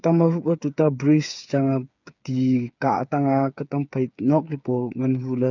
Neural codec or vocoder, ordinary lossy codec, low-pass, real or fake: codec, 16 kHz, 8 kbps, FreqCodec, smaller model; none; 7.2 kHz; fake